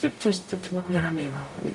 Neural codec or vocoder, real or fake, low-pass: codec, 44.1 kHz, 0.9 kbps, DAC; fake; 10.8 kHz